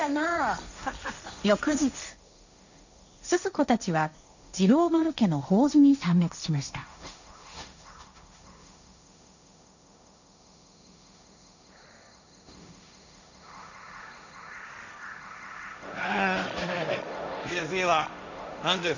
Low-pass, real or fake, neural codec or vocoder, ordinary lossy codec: 7.2 kHz; fake; codec, 16 kHz, 1.1 kbps, Voila-Tokenizer; none